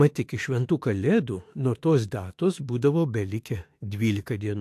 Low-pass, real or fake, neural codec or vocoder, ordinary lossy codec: 14.4 kHz; fake; autoencoder, 48 kHz, 32 numbers a frame, DAC-VAE, trained on Japanese speech; AAC, 64 kbps